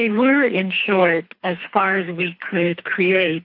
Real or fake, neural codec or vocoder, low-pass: fake; codec, 24 kHz, 3 kbps, HILCodec; 5.4 kHz